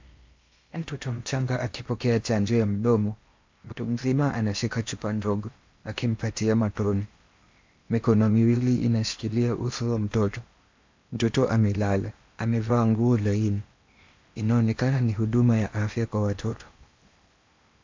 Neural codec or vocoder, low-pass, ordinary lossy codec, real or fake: codec, 16 kHz in and 24 kHz out, 0.8 kbps, FocalCodec, streaming, 65536 codes; 7.2 kHz; MP3, 64 kbps; fake